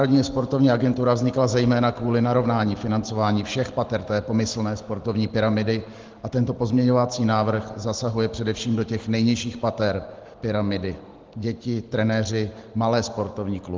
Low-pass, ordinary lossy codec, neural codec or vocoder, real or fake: 7.2 kHz; Opus, 16 kbps; none; real